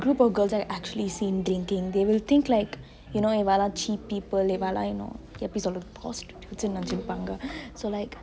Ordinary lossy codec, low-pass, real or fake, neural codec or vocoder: none; none; real; none